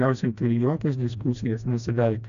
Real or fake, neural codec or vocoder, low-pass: fake; codec, 16 kHz, 1 kbps, FreqCodec, smaller model; 7.2 kHz